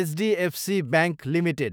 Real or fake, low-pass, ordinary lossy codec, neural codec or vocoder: fake; none; none; autoencoder, 48 kHz, 128 numbers a frame, DAC-VAE, trained on Japanese speech